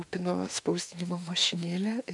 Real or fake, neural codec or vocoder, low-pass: fake; autoencoder, 48 kHz, 32 numbers a frame, DAC-VAE, trained on Japanese speech; 10.8 kHz